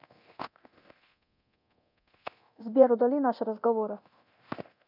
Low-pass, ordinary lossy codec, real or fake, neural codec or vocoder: 5.4 kHz; none; fake; codec, 24 kHz, 0.9 kbps, DualCodec